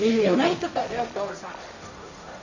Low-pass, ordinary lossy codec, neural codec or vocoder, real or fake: 7.2 kHz; none; codec, 16 kHz, 1.1 kbps, Voila-Tokenizer; fake